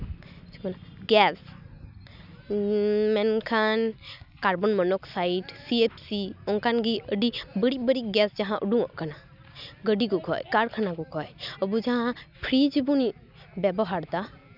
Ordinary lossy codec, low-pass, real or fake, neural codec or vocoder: none; 5.4 kHz; real; none